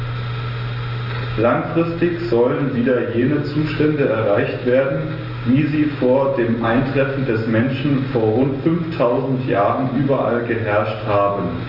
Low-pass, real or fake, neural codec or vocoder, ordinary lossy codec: 5.4 kHz; real; none; Opus, 32 kbps